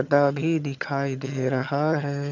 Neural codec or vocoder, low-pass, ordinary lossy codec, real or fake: vocoder, 22.05 kHz, 80 mel bands, HiFi-GAN; 7.2 kHz; none; fake